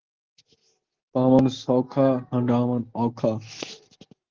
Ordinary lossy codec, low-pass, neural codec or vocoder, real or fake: Opus, 16 kbps; 7.2 kHz; none; real